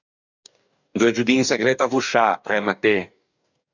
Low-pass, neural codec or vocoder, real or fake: 7.2 kHz; codec, 44.1 kHz, 2.6 kbps, DAC; fake